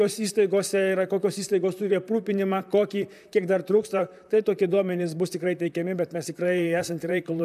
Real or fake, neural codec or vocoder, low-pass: fake; vocoder, 44.1 kHz, 128 mel bands, Pupu-Vocoder; 14.4 kHz